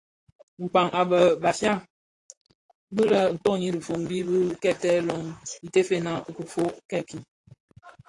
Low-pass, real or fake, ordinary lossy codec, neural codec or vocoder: 10.8 kHz; fake; AAC, 48 kbps; vocoder, 44.1 kHz, 128 mel bands, Pupu-Vocoder